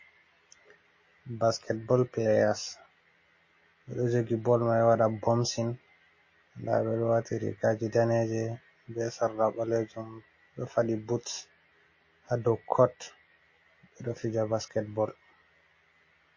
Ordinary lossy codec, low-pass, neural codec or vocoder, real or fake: MP3, 32 kbps; 7.2 kHz; none; real